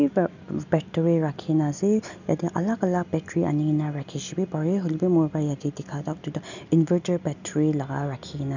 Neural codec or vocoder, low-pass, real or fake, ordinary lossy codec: none; 7.2 kHz; real; none